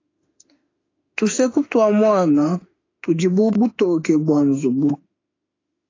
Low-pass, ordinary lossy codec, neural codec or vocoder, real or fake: 7.2 kHz; AAC, 32 kbps; autoencoder, 48 kHz, 32 numbers a frame, DAC-VAE, trained on Japanese speech; fake